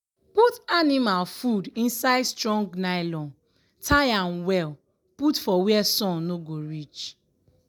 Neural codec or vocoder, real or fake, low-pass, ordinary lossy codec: none; real; none; none